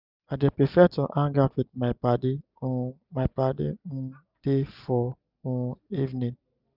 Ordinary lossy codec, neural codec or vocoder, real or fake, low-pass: none; none; real; 5.4 kHz